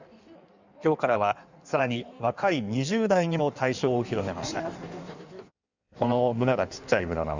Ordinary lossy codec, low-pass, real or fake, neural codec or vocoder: Opus, 64 kbps; 7.2 kHz; fake; codec, 16 kHz in and 24 kHz out, 1.1 kbps, FireRedTTS-2 codec